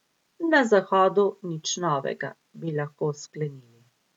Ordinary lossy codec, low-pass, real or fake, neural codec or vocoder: none; 19.8 kHz; real; none